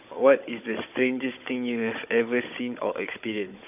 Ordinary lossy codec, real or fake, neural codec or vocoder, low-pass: none; fake; codec, 16 kHz, 16 kbps, FunCodec, trained on Chinese and English, 50 frames a second; 3.6 kHz